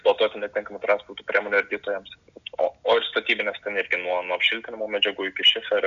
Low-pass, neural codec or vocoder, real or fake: 7.2 kHz; none; real